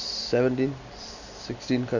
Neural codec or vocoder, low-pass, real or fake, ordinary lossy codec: none; 7.2 kHz; real; none